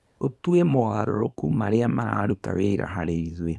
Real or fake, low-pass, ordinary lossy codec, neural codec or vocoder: fake; none; none; codec, 24 kHz, 0.9 kbps, WavTokenizer, small release